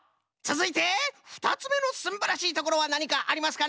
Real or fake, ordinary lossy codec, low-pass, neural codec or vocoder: real; none; none; none